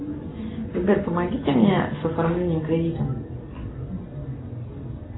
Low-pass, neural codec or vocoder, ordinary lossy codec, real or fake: 7.2 kHz; none; AAC, 16 kbps; real